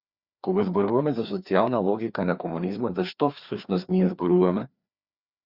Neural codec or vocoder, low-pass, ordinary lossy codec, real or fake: codec, 16 kHz, 2 kbps, FreqCodec, larger model; 5.4 kHz; Opus, 64 kbps; fake